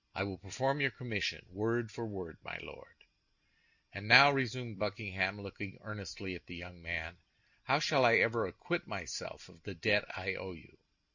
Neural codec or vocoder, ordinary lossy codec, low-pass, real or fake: none; Opus, 64 kbps; 7.2 kHz; real